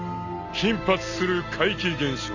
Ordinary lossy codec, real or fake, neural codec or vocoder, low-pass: none; real; none; 7.2 kHz